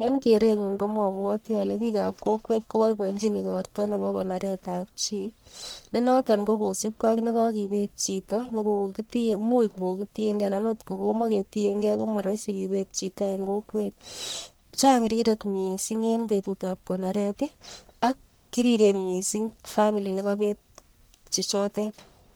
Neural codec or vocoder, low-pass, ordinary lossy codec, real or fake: codec, 44.1 kHz, 1.7 kbps, Pupu-Codec; none; none; fake